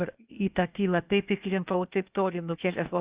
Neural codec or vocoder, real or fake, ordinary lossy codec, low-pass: codec, 16 kHz, 0.8 kbps, ZipCodec; fake; Opus, 64 kbps; 3.6 kHz